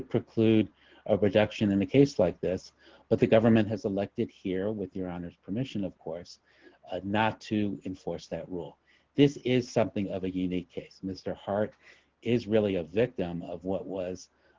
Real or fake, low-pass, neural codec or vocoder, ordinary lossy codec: real; 7.2 kHz; none; Opus, 16 kbps